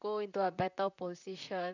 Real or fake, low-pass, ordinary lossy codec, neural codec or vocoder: fake; 7.2 kHz; none; vocoder, 44.1 kHz, 128 mel bands, Pupu-Vocoder